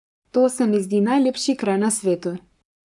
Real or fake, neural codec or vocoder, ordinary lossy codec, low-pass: fake; codec, 44.1 kHz, 7.8 kbps, Pupu-Codec; none; 10.8 kHz